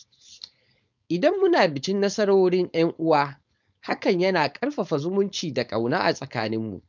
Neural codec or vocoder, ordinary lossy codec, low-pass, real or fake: codec, 16 kHz, 4.8 kbps, FACodec; none; 7.2 kHz; fake